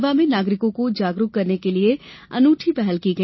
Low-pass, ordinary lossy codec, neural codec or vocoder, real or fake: 7.2 kHz; MP3, 24 kbps; none; real